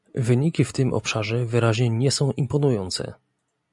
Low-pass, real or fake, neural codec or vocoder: 10.8 kHz; real; none